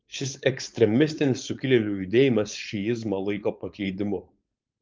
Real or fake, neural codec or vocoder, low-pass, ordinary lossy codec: fake; codec, 16 kHz, 4.8 kbps, FACodec; 7.2 kHz; Opus, 24 kbps